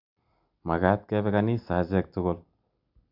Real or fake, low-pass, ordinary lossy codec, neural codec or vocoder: real; 5.4 kHz; none; none